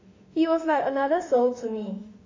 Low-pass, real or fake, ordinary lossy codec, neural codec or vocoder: 7.2 kHz; fake; AAC, 32 kbps; autoencoder, 48 kHz, 32 numbers a frame, DAC-VAE, trained on Japanese speech